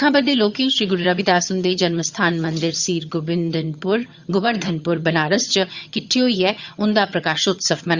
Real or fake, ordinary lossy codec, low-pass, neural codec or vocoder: fake; Opus, 64 kbps; 7.2 kHz; vocoder, 22.05 kHz, 80 mel bands, HiFi-GAN